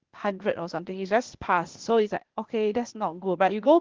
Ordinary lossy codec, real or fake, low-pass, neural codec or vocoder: Opus, 16 kbps; fake; 7.2 kHz; codec, 16 kHz, 0.8 kbps, ZipCodec